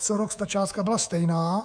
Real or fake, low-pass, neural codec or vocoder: fake; 9.9 kHz; codec, 24 kHz, 3.1 kbps, DualCodec